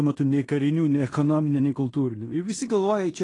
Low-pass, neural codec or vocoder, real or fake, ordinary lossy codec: 10.8 kHz; codec, 16 kHz in and 24 kHz out, 0.9 kbps, LongCat-Audio-Codec, fine tuned four codebook decoder; fake; AAC, 32 kbps